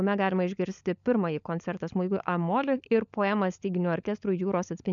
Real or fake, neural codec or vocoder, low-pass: fake; codec, 16 kHz, 16 kbps, FunCodec, trained on LibriTTS, 50 frames a second; 7.2 kHz